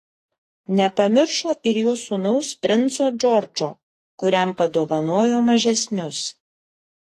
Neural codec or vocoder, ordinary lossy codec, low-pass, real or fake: codec, 44.1 kHz, 2.6 kbps, SNAC; AAC, 48 kbps; 14.4 kHz; fake